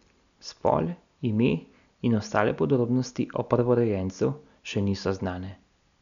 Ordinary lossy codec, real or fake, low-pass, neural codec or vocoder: none; real; 7.2 kHz; none